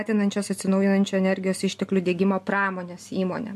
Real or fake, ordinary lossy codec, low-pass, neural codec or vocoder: real; MP3, 64 kbps; 14.4 kHz; none